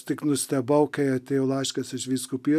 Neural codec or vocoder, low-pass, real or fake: none; 14.4 kHz; real